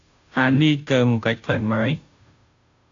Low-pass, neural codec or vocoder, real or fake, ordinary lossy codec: 7.2 kHz; codec, 16 kHz, 0.5 kbps, FunCodec, trained on Chinese and English, 25 frames a second; fake; AAC, 48 kbps